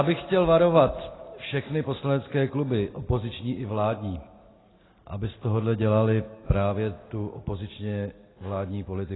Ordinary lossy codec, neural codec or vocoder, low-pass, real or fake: AAC, 16 kbps; none; 7.2 kHz; real